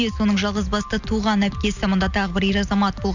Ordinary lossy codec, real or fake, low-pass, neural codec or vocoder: none; real; 7.2 kHz; none